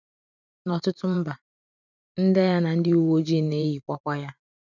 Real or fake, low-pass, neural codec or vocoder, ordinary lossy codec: fake; 7.2 kHz; vocoder, 44.1 kHz, 128 mel bands every 512 samples, BigVGAN v2; none